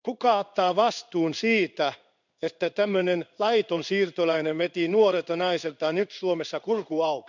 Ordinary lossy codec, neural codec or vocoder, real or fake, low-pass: none; codec, 16 kHz in and 24 kHz out, 1 kbps, XY-Tokenizer; fake; 7.2 kHz